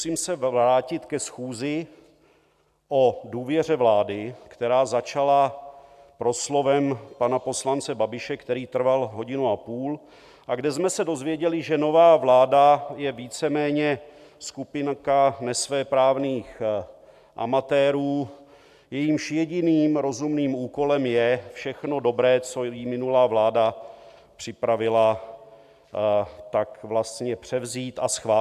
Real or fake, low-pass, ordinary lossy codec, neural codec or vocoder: real; 14.4 kHz; AAC, 96 kbps; none